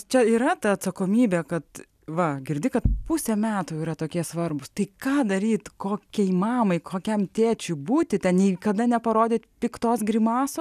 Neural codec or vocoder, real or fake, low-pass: none; real; 14.4 kHz